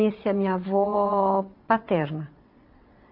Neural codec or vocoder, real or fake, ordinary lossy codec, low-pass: vocoder, 22.05 kHz, 80 mel bands, WaveNeXt; fake; AAC, 32 kbps; 5.4 kHz